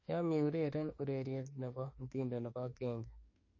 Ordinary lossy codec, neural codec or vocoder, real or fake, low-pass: MP3, 32 kbps; autoencoder, 48 kHz, 32 numbers a frame, DAC-VAE, trained on Japanese speech; fake; 7.2 kHz